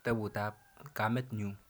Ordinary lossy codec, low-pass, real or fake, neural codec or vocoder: none; none; real; none